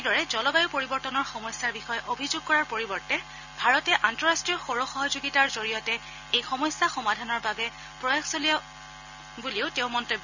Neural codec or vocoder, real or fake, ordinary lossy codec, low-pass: none; real; none; 7.2 kHz